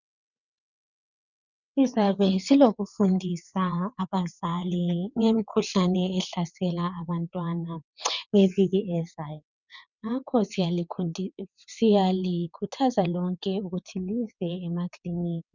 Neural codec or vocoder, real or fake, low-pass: vocoder, 22.05 kHz, 80 mel bands, WaveNeXt; fake; 7.2 kHz